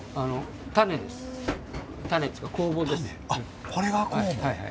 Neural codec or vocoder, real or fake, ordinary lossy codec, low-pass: none; real; none; none